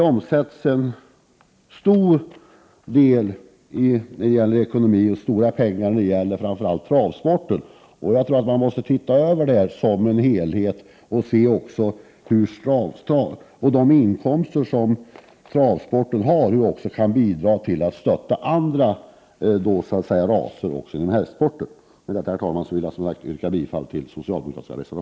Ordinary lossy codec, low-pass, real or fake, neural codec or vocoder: none; none; real; none